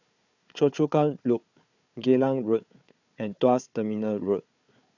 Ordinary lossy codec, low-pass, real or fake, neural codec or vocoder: none; 7.2 kHz; fake; codec, 16 kHz, 4 kbps, FunCodec, trained on Chinese and English, 50 frames a second